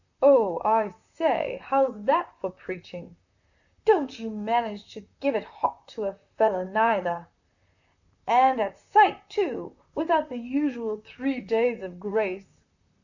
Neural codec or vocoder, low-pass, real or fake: vocoder, 44.1 kHz, 128 mel bands, Pupu-Vocoder; 7.2 kHz; fake